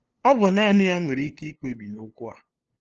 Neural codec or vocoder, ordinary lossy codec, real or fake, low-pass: codec, 16 kHz, 2 kbps, FunCodec, trained on LibriTTS, 25 frames a second; Opus, 16 kbps; fake; 7.2 kHz